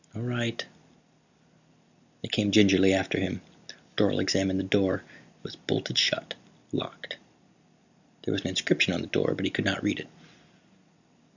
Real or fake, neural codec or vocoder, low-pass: real; none; 7.2 kHz